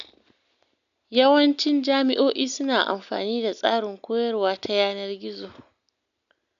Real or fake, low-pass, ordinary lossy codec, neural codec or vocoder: real; 7.2 kHz; none; none